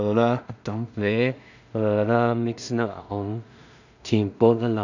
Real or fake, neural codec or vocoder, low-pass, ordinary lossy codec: fake; codec, 16 kHz in and 24 kHz out, 0.4 kbps, LongCat-Audio-Codec, two codebook decoder; 7.2 kHz; none